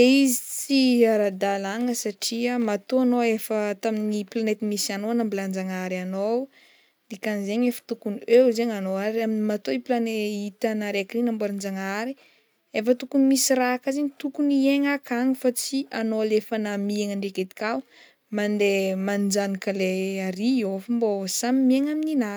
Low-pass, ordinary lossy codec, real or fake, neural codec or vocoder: none; none; real; none